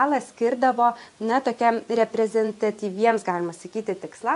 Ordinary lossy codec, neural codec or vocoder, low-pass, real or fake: AAC, 64 kbps; vocoder, 24 kHz, 100 mel bands, Vocos; 10.8 kHz; fake